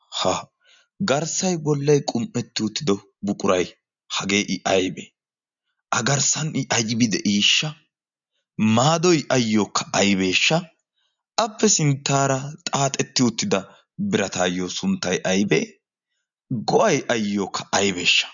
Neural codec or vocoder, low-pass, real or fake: none; 7.2 kHz; real